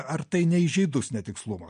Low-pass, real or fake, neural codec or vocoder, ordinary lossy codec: 9.9 kHz; real; none; MP3, 48 kbps